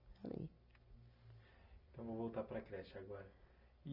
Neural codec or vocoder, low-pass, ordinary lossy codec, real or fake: none; 5.4 kHz; none; real